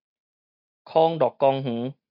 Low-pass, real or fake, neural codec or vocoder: 5.4 kHz; real; none